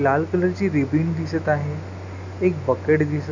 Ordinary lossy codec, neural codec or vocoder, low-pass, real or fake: none; none; 7.2 kHz; real